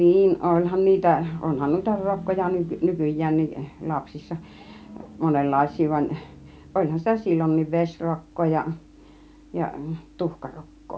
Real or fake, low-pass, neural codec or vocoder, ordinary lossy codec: real; none; none; none